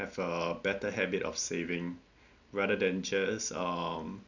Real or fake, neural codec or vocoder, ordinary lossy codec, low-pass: real; none; none; 7.2 kHz